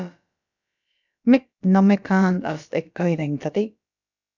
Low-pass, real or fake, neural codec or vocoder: 7.2 kHz; fake; codec, 16 kHz, about 1 kbps, DyCAST, with the encoder's durations